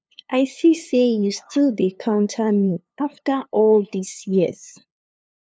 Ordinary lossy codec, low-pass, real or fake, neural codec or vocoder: none; none; fake; codec, 16 kHz, 2 kbps, FunCodec, trained on LibriTTS, 25 frames a second